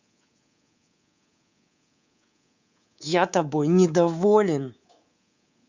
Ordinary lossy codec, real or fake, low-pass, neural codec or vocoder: Opus, 64 kbps; fake; 7.2 kHz; codec, 24 kHz, 3.1 kbps, DualCodec